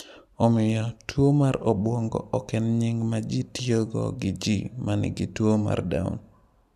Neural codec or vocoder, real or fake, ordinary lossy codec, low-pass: none; real; AAC, 96 kbps; 14.4 kHz